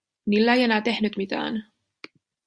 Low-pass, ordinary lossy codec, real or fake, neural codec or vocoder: 9.9 kHz; Opus, 64 kbps; real; none